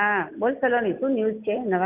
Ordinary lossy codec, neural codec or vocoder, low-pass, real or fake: Opus, 64 kbps; none; 3.6 kHz; real